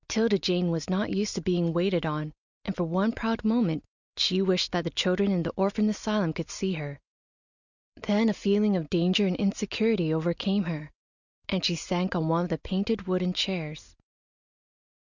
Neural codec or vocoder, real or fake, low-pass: none; real; 7.2 kHz